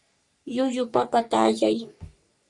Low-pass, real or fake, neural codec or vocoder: 10.8 kHz; fake; codec, 44.1 kHz, 3.4 kbps, Pupu-Codec